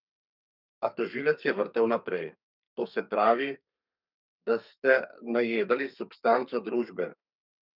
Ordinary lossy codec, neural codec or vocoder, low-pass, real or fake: none; codec, 32 kHz, 1.9 kbps, SNAC; 5.4 kHz; fake